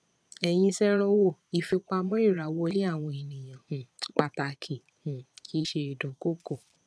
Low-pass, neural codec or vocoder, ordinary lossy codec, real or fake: none; vocoder, 22.05 kHz, 80 mel bands, Vocos; none; fake